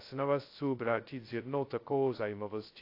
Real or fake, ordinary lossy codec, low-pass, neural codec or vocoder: fake; AAC, 32 kbps; 5.4 kHz; codec, 16 kHz, 0.2 kbps, FocalCodec